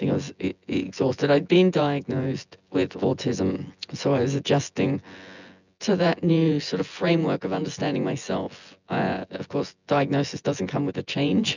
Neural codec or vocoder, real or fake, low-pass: vocoder, 24 kHz, 100 mel bands, Vocos; fake; 7.2 kHz